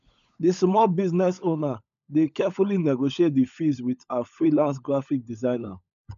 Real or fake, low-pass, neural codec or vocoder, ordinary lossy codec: fake; 7.2 kHz; codec, 16 kHz, 16 kbps, FunCodec, trained on LibriTTS, 50 frames a second; none